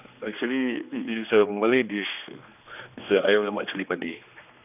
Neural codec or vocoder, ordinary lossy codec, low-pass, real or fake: codec, 16 kHz, 2 kbps, X-Codec, HuBERT features, trained on general audio; none; 3.6 kHz; fake